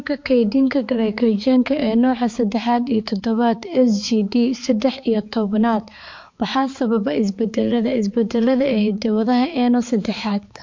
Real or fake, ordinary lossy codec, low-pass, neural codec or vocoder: fake; MP3, 48 kbps; 7.2 kHz; codec, 16 kHz, 4 kbps, X-Codec, HuBERT features, trained on balanced general audio